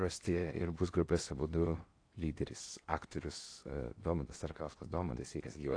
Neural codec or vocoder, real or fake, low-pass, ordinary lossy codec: codec, 16 kHz in and 24 kHz out, 0.8 kbps, FocalCodec, streaming, 65536 codes; fake; 9.9 kHz; AAC, 48 kbps